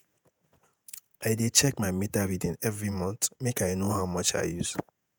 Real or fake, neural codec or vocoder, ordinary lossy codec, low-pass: fake; vocoder, 48 kHz, 128 mel bands, Vocos; none; none